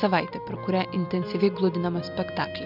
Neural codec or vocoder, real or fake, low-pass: none; real; 5.4 kHz